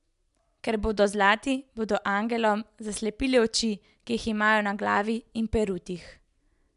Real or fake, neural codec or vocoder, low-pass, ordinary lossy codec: real; none; 10.8 kHz; MP3, 96 kbps